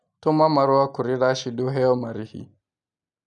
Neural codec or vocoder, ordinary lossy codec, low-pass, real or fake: none; none; 10.8 kHz; real